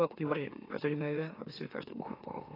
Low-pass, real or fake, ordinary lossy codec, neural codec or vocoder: 5.4 kHz; fake; AAC, 24 kbps; autoencoder, 44.1 kHz, a latent of 192 numbers a frame, MeloTTS